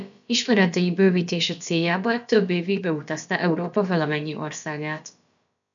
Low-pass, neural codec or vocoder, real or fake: 7.2 kHz; codec, 16 kHz, about 1 kbps, DyCAST, with the encoder's durations; fake